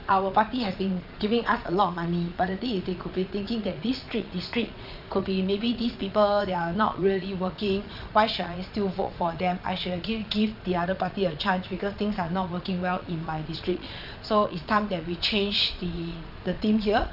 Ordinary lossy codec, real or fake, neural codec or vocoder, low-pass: none; fake; vocoder, 22.05 kHz, 80 mel bands, Vocos; 5.4 kHz